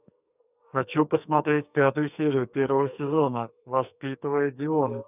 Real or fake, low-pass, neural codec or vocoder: fake; 3.6 kHz; codec, 44.1 kHz, 2.6 kbps, SNAC